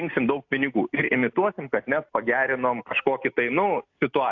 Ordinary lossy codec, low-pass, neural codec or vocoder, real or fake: AAC, 48 kbps; 7.2 kHz; none; real